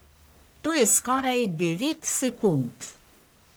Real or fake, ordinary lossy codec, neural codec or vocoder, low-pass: fake; none; codec, 44.1 kHz, 1.7 kbps, Pupu-Codec; none